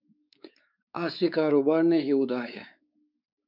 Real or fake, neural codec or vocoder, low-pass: fake; codec, 16 kHz, 4 kbps, X-Codec, WavLM features, trained on Multilingual LibriSpeech; 5.4 kHz